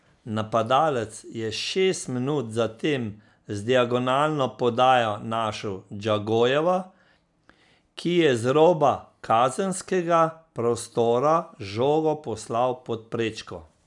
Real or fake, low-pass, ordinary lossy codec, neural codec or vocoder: real; 10.8 kHz; none; none